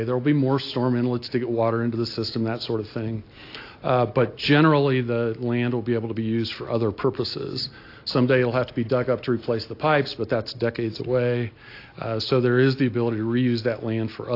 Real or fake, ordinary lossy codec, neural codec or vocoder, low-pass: real; AAC, 32 kbps; none; 5.4 kHz